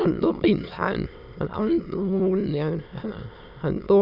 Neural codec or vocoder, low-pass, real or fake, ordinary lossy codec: autoencoder, 22.05 kHz, a latent of 192 numbers a frame, VITS, trained on many speakers; 5.4 kHz; fake; none